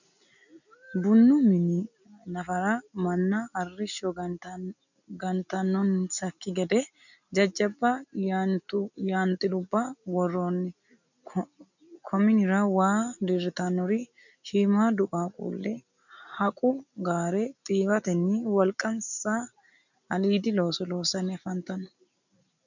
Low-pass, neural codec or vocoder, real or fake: 7.2 kHz; none; real